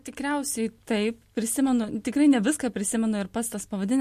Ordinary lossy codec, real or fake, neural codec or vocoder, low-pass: MP3, 64 kbps; real; none; 14.4 kHz